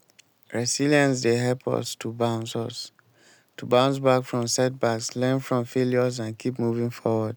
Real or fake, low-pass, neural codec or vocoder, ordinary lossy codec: real; none; none; none